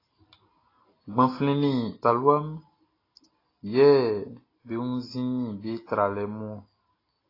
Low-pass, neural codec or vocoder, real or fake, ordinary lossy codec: 5.4 kHz; none; real; AAC, 24 kbps